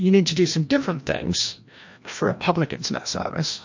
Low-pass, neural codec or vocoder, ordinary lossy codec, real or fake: 7.2 kHz; codec, 16 kHz, 1 kbps, FreqCodec, larger model; MP3, 48 kbps; fake